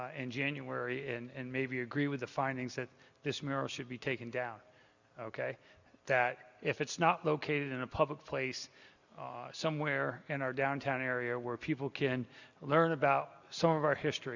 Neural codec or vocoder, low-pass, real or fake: none; 7.2 kHz; real